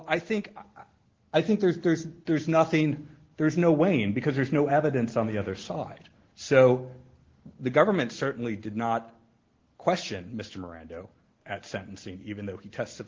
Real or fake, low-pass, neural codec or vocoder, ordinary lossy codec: real; 7.2 kHz; none; Opus, 16 kbps